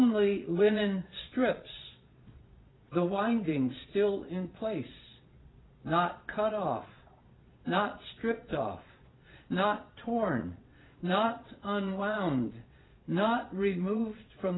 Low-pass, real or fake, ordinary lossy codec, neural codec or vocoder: 7.2 kHz; fake; AAC, 16 kbps; vocoder, 22.05 kHz, 80 mel bands, Vocos